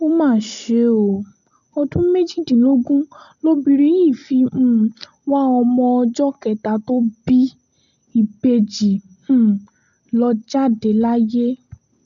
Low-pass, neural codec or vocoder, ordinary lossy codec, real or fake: 7.2 kHz; none; none; real